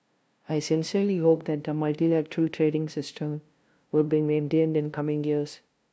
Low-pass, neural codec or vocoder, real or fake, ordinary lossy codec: none; codec, 16 kHz, 0.5 kbps, FunCodec, trained on LibriTTS, 25 frames a second; fake; none